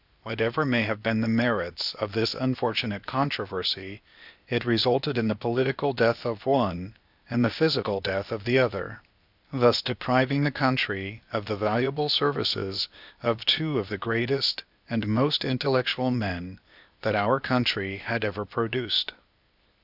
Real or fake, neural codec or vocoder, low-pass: fake; codec, 16 kHz, 0.8 kbps, ZipCodec; 5.4 kHz